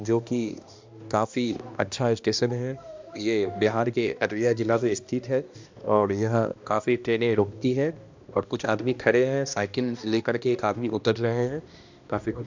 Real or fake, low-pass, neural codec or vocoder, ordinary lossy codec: fake; 7.2 kHz; codec, 16 kHz, 1 kbps, X-Codec, HuBERT features, trained on balanced general audio; MP3, 64 kbps